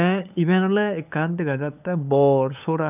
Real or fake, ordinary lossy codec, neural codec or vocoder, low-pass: fake; none; codec, 16 kHz, 8 kbps, FunCodec, trained on LibriTTS, 25 frames a second; 3.6 kHz